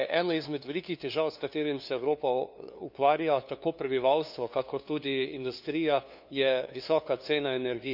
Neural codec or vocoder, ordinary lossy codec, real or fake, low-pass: codec, 16 kHz, 2 kbps, FunCodec, trained on LibriTTS, 25 frames a second; none; fake; 5.4 kHz